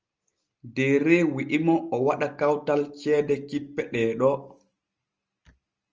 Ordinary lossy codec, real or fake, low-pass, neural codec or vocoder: Opus, 24 kbps; real; 7.2 kHz; none